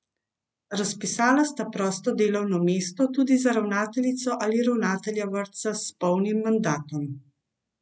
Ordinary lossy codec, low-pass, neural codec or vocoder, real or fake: none; none; none; real